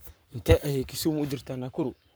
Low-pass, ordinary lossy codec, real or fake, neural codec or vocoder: none; none; fake; codec, 44.1 kHz, 7.8 kbps, Pupu-Codec